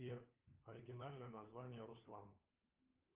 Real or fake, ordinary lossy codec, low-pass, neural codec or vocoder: fake; Opus, 32 kbps; 3.6 kHz; codec, 16 kHz, 4 kbps, FunCodec, trained on LibriTTS, 50 frames a second